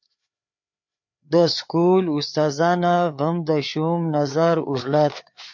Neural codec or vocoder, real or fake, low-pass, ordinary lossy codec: codec, 16 kHz, 4 kbps, FreqCodec, larger model; fake; 7.2 kHz; MP3, 48 kbps